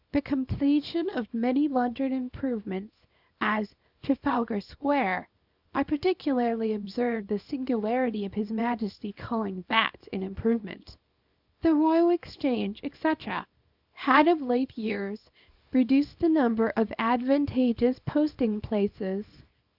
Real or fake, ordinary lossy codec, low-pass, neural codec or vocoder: fake; Opus, 64 kbps; 5.4 kHz; codec, 24 kHz, 0.9 kbps, WavTokenizer, small release